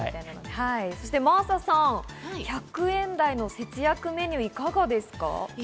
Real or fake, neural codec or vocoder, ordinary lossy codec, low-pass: real; none; none; none